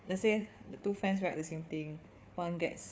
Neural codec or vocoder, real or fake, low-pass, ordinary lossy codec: codec, 16 kHz, 4 kbps, FunCodec, trained on Chinese and English, 50 frames a second; fake; none; none